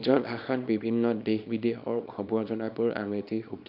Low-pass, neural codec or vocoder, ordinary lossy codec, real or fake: 5.4 kHz; codec, 24 kHz, 0.9 kbps, WavTokenizer, small release; none; fake